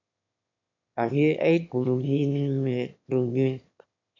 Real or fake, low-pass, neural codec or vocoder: fake; 7.2 kHz; autoencoder, 22.05 kHz, a latent of 192 numbers a frame, VITS, trained on one speaker